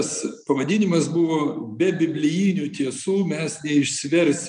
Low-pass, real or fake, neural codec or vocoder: 9.9 kHz; real; none